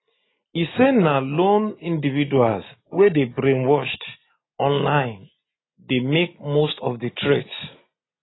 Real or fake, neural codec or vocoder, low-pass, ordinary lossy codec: real; none; 7.2 kHz; AAC, 16 kbps